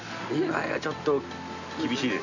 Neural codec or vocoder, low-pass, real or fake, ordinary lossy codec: none; 7.2 kHz; real; none